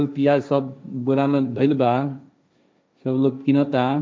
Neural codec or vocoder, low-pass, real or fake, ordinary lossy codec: codec, 16 kHz, 1.1 kbps, Voila-Tokenizer; none; fake; none